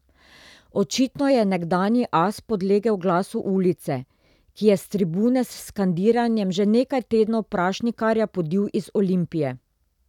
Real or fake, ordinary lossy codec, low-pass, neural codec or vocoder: real; none; 19.8 kHz; none